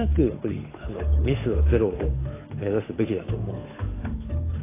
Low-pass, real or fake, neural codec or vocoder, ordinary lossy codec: 3.6 kHz; fake; codec, 16 kHz, 8 kbps, FunCodec, trained on Chinese and English, 25 frames a second; AAC, 16 kbps